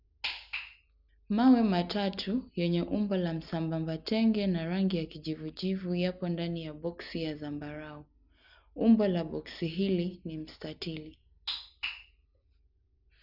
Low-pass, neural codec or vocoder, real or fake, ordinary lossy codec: 5.4 kHz; none; real; none